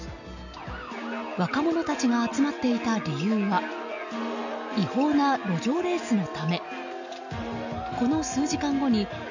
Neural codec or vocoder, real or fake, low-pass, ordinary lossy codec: none; real; 7.2 kHz; none